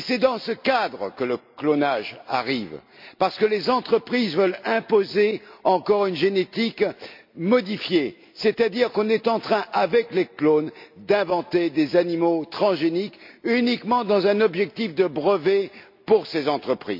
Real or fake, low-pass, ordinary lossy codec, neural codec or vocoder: real; 5.4 kHz; MP3, 48 kbps; none